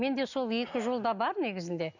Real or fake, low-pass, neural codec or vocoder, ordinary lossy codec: real; 7.2 kHz; none; none